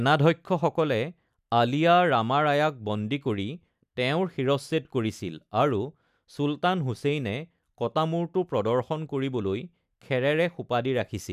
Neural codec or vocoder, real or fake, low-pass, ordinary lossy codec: none; real; 14.4 kHz; none